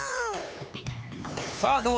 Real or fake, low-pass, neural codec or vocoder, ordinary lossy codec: fake; none; codec, 16 kHz, 4 kbps, X-Codec, HuBERT features, trained on LibriSpeech; none